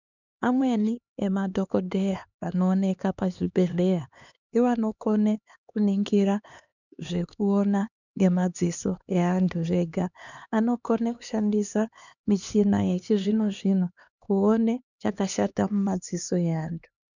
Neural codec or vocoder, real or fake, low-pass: codec, 16 kHz, 2 kbps, X-Codec, HuBERT features, trained on LibriSpeech; fake; 7.2 kHz